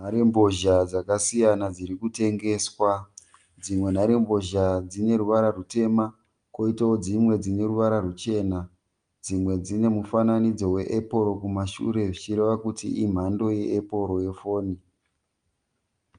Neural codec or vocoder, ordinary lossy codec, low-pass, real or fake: none; Opus, 32 kbps; 9.9 kHz; real